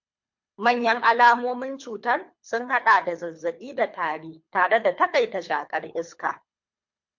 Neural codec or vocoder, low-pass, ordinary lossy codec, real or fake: codec, 24 kHz, 3 kbps, HILCodec; 7.2 kHz; MP3, 48 kbps; fake